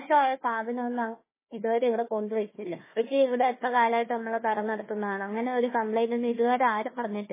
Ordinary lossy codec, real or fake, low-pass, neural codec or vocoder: MP3, 16 kbps; fake; 3.6 kHz; codec, 16 kHz, 1 kbps, FunCodec, trained on Chinese and English, 50 frames a second